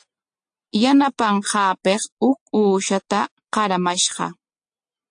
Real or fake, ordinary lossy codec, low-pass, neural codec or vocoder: real; AAC, 64 kbps; 9.9 kHz; none